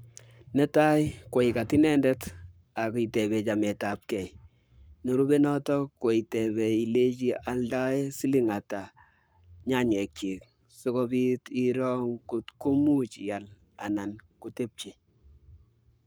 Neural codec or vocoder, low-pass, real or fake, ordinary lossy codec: codec, 44.1 kHz, 7.8 kbps, Pupu-Codec; none; fake; none